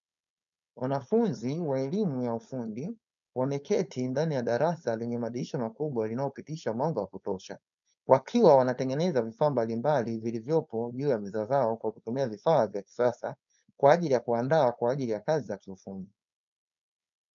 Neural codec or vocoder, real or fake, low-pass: codec, 16 kHz, 4.8 kbps, FACodec; fake; 7.2 kHz